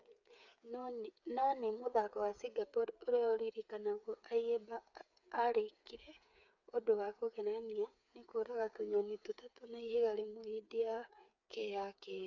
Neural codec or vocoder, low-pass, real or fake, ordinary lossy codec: codec, 16 kHz, 8 kbps, FreqCodec, smaller model; 7.2 kHz; fake; none